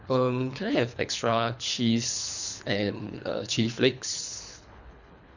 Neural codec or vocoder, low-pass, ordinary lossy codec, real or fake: codec, 24 kHz, 3 kbps, HILCodec; 7.2 kHz; none; fake